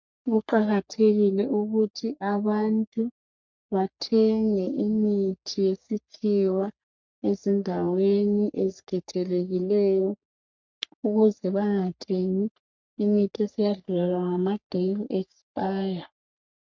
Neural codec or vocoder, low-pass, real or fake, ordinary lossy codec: codec, 44.1 kHz, 3.4 kbps, Pupu-Codec; 7.2 kHz; fake; MP3, 64 kbps